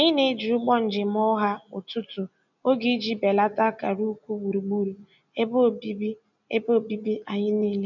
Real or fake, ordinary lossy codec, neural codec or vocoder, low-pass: real; none; none; 7.2 kHz